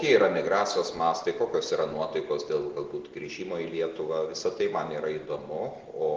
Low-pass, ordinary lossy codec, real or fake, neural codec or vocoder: 7.2 kHz; Opus, 16 kbps; real; none